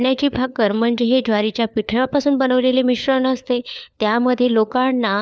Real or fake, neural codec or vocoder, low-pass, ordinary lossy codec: fake; codec, 16 kHz, 4 kbps, FunCodec, trained on LibriTTS, 50 frames a second; none; none